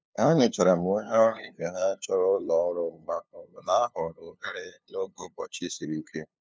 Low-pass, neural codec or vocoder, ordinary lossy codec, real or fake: none; codec, 16 kHz, 2 kbps, FunCodec, trained on LibriTTS, 25 frames a second; none; fake